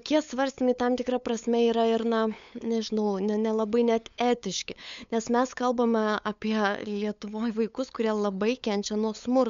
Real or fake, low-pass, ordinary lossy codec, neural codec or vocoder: fake; 7.2 kHz; MP3, 64 kbps; codec, 16 kHz, 8 kbps, FunCodec, trained on LibriTTS, 25 frames a second